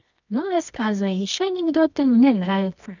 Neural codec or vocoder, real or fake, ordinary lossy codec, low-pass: codec, 24 kHz, 0.9 kbps, WavTokenizer, medium music audio release; fake; none; 7.2 kHz